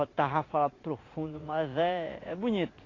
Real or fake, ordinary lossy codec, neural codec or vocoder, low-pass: fake; AAC, 32 kbps; vocoder, 44.1 kHz, 80 mel bands, Vocos; 7.2 kHz